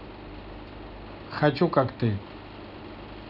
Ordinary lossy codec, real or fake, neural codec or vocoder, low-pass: none; real; none; 5.4 kHz